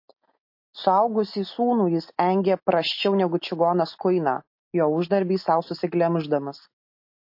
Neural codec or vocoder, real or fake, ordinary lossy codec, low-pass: none; real; MP3, 32 kbps; 5.4 kHz